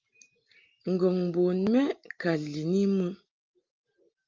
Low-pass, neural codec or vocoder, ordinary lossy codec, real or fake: 7.2 kHz; none; Opus, 24 kbps; real